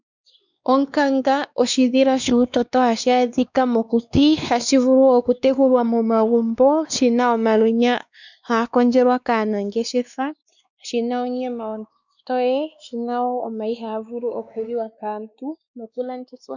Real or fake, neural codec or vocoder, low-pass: fake; codec, 16 kHz, 2 kbps, X-Codec, WavLM features, trained on Multilingual LibriSpeech; 7.2 kHz